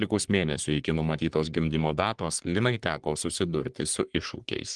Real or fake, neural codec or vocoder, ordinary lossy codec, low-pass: fake; codec, 44.1 kHz, 3.4 kbps, Pupu-Codec; Opus, 24 kbps; 10.8 kHz